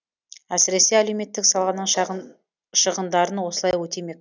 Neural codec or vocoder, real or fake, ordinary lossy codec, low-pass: none; real; none; 7.2 kHz